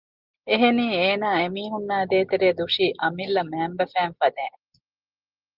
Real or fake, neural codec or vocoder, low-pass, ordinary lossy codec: real; none; 5.4 kHz; Opus, 24 kbps